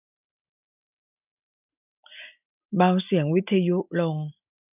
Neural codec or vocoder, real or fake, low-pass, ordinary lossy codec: none; real; 3.6 kHz; none